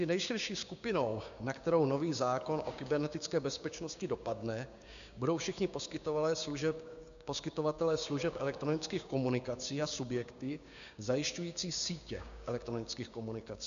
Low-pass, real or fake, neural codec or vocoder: 7.2 kHz; fake; codec, 16 kHz, 6 kbps, DAC